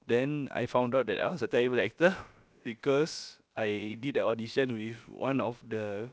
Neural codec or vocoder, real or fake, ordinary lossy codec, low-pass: codec, 16 kHz, about 1 kbps, DyCAST, with the encoder's durations; fake; none; none